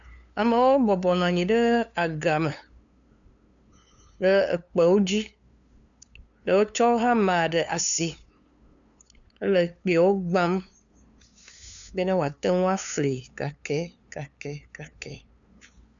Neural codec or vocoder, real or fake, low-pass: codec, 16 kHz, 2 kbps, FunCodec, trained on LibriTTS, 25 frames a second; fake; 7.2 kHz